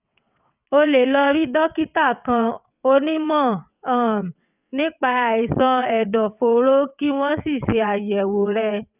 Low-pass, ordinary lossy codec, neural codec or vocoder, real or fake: 3.6 kHz; none; vocoder, 22.05 kHz, 80 mel bands, WaveNeXt; fake